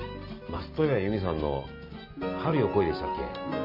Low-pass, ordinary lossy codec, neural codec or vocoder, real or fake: 5.4 kHz; MP3, 48 kbps; none; real